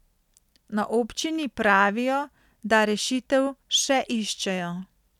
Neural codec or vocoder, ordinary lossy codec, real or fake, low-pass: none; none; real; 19.8 kHz